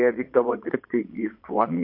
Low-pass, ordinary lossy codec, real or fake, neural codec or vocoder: 5.4 kHz; MP3, 32 kbps; fake; vocoder, 22.05 kHz, 80 mel bands, Vocos